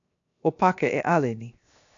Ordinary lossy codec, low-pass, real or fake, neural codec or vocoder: AAC, 64 kbps; 7.2 kHz; fake; codec, 16 kHz, 0.7 kbps, FocalCodec